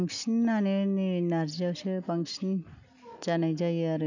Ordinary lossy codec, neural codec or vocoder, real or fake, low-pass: none; none; real; 7.2 kHz